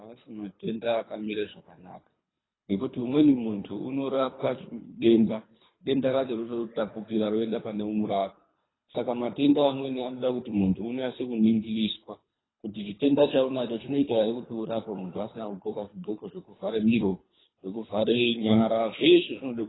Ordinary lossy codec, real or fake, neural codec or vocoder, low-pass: AAC, 16 kbps; fake; codec, 24 kHz, 3 kbps, HILCodec; 7.2 kHz